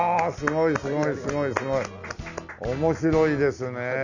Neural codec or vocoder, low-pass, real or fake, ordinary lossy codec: none; 7.2 kHz; real; none